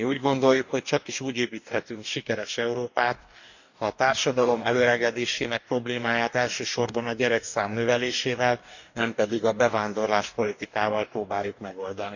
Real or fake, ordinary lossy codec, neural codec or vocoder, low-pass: fake; none; codec, 44.1 kHz, 2.6 kbps, DAC; 7.2 kHz